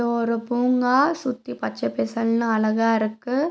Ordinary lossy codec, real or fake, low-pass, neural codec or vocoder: none; real; none; none